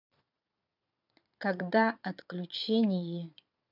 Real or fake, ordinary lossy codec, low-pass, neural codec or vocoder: fake; none; 5.4 kHz; vocoder, 22.05 kHz, 80 mel bands, Vocos